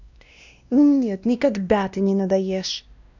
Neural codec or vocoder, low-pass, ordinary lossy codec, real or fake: codec, 16 kHz, 1 kbps, X-Codec, WavLM features, trained on Multilingual LibriSpeech; 7.2 kHz; none; fake